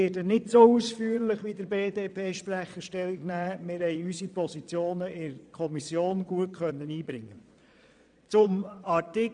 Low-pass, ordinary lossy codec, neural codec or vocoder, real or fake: 9.9 kHz; none; vocoder, 22.05 kHz, 80 mel bands, Vocos; fake